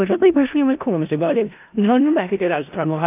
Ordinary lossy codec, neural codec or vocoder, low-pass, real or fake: AAC, 24 kbps; codec, 16 kHz in and 24 kHz out, 0.4 kbps, LongCat-Audio-Codec, four codebook decoder; 3.6 kHz; fake